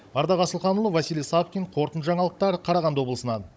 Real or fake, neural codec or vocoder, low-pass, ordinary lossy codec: fake; codec, 16 kHz, 16 kbps, FunCodec, trained on Chinese and English, 50 frames a second; none; none